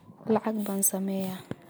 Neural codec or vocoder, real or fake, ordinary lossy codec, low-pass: none; real; none; none